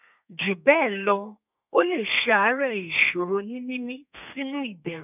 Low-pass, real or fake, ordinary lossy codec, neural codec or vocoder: 3.6 kHz; fake; none; codec, 32 kHz, 1.9 kbps, SNAC